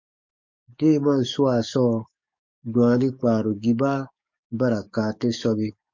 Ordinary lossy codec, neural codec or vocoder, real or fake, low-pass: MP3, 48 kbps; codec, 44.1 kHz, 7.8 kbps, DAC; fake; 7.2 kHz